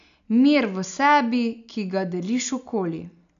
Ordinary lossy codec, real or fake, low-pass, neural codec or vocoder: none; real; 7.2 kHz; none